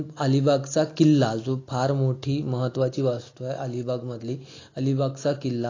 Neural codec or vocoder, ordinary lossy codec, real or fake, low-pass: none; AAC, 32 kbps; real; 7.2 kHz